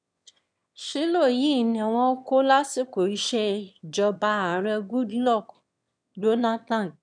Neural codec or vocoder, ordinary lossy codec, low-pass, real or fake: autoencoder, 22.05 kHz, a latent of 192 numbers a frame, VITS, trained on one speaker; none; 9.9 kHz; fake